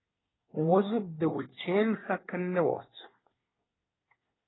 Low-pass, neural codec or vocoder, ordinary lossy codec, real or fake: 7.2 kHz; codec, 24 kHz, 1 kbps, SNAC; AAC, 16 kbps; fake